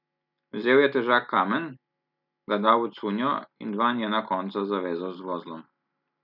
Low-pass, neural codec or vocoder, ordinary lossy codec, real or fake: 5.4 kHz; none; none; real